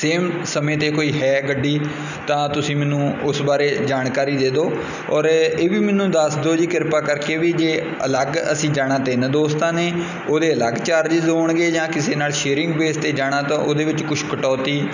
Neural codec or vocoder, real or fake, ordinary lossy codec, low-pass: none; real; none; 7.2 kHz